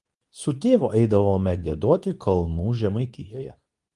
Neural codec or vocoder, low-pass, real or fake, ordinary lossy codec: codec, 24 kHz, 0.9 kbps, WavTokenizer, medium speech release version 2; 10.8 kHz; fake; Opus, 24 kbps